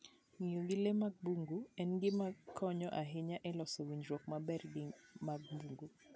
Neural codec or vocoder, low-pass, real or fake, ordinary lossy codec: none; none; real; none